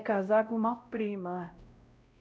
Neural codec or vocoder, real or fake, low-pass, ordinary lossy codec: codec, 16 kHz, 0.5 kbps, X-Codec, WavLM features, trained on Multilingual LibriSpeech; fake; none; none